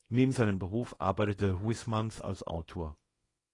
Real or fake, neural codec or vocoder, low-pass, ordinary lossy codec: fake; codec, 24 kHz, 0.9 kbps, WavTokenizer, small release; 10.8 kHz; AAC, 32 kbps